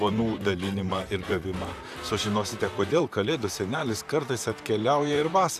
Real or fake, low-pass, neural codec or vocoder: fake; 14.4 kHz; vocoder, 44.1 kHz, 128 mel bands, Pupu-Vocoder